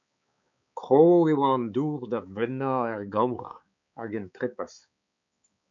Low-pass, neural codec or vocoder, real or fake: 7.2 kHz; codec, 16 kHz, 4 kbps, X-Codec, HuBERT features, trained on balanced general audio; fake